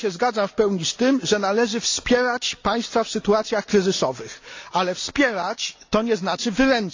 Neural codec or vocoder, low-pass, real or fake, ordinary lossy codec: none; 7.2 kHz; real; AAC, 48 kbps